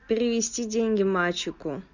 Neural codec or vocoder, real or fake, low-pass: none; real; 7.2 kHz